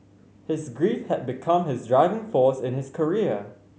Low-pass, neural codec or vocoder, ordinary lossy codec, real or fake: none; none; none; real